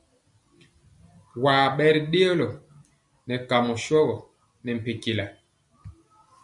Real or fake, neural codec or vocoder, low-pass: real; none; 10.8 kHz